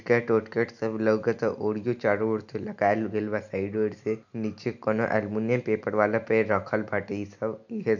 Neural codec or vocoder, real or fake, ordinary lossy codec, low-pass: none; real; none; 7.2 kHz